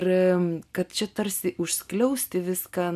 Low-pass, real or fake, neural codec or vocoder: 14.4 kHz; real; none